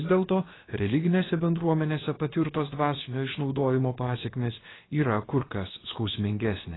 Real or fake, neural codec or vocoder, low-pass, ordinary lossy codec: fake; codec, 16 kHz, about 1 kbps, DyCAST, with the encoder's durations; 7.2 kHz; AAC, 16 kbps